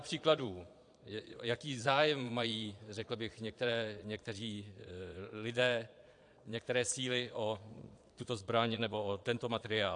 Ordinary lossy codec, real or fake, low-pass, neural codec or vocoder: AAC, 64 kbps; fake; 9.9 kHz; vocoder, 22.05 kHz, 80 mel bands, Vocos